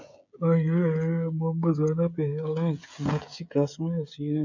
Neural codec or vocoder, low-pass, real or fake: codec, 16 kHz, 16 kbps, FreqCodec, smaller model; 7.2 kHz; fake